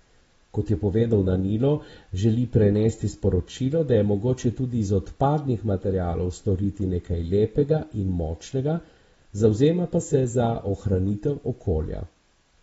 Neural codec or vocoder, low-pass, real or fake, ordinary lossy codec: none; 9.9 kHz; real; AAC, 24 kbps